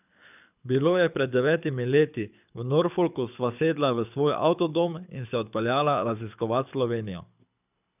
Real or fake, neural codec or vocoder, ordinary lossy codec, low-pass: fake; codec, 24 kHz, 6 kbps, HILCodec; none; 3.6 kHz